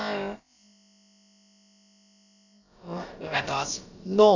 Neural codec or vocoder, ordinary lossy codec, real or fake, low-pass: codec, 16 kHz, about 1 kbps, DyCAST, with the encoder's durations; none; fake; 7.2 kHz